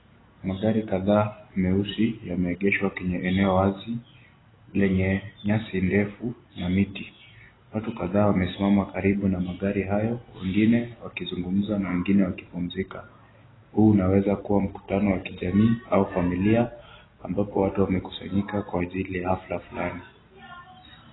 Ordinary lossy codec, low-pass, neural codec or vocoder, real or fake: AAC, 16 kbps; 7.2 kHz; none; real